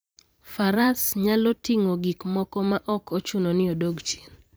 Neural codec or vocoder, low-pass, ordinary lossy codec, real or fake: vocoder, 44.1 kHz, 128 mel bands every 512 samples, BigVGAN v2; none; none; fake